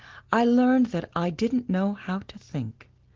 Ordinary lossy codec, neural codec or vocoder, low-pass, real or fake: Opus, 16 kbps; none; 7.2 kHz; real